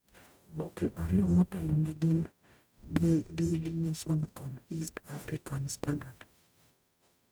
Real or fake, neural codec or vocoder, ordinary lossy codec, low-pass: fake; codec, 44.1 kHz, 0.9 kbps, DAC; none; none